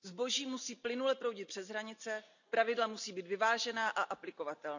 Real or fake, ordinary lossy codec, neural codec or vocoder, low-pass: real; none; none; 7.2 kHz